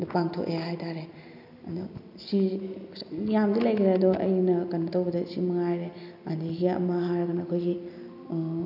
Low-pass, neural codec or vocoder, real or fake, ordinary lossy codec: 5.4 kHz; none; real; none